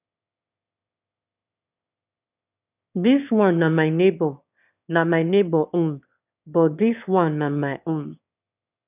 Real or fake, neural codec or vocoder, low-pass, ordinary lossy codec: fake; autoencoder, 22.05 kHz, a latent of 192 numbers a frame, VITS, trained on one speaker; 3.6 kHz; none